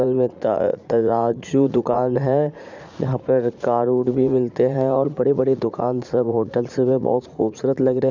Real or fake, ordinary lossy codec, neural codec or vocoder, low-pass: fake; none; vocoder, 44.1 kHz, 80 mel bands, Vocos; 7.2 kHz